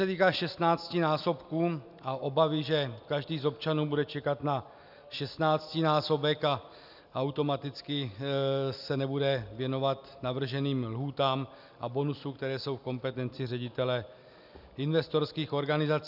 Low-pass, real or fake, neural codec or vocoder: 5.4 kHz; real; none